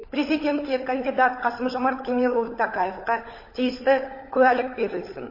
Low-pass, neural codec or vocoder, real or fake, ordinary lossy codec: 5.4 kHz; codec, 16 kHz, 16 kbps, FunCodec, trained on LibriTTS, 50 frames a second; fake; MP3, 24 kbps